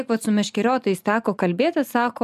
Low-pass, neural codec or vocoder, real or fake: 14.4 kHz; none; real